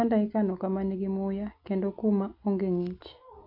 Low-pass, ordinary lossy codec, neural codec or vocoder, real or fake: 5.4 kHz; AAC, 48 kbps; none; real